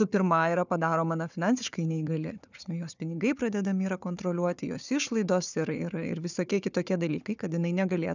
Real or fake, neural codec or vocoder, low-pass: fake; codec, 16 kHz, 4 kbps, FunCodec, trained on Chinese and English, 50 frames a second; 7.2 kHz